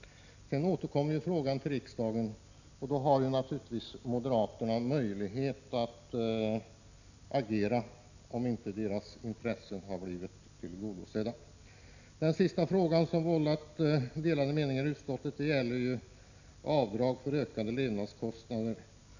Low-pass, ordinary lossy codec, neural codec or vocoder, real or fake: 7.2 kHz; none; none; real